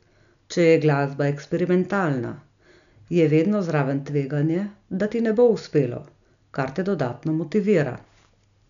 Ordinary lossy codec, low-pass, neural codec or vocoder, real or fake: none; 7.2 kHz; none; real